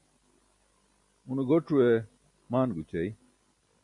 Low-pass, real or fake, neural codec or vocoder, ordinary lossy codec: 10.8 kHz; real; none; MP3, 96 kbps